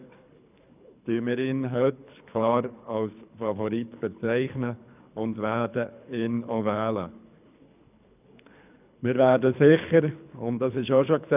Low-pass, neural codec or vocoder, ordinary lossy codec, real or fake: 3.6 kHz; codec, 24 kHz, 3 kbps, HILCodec; none; fake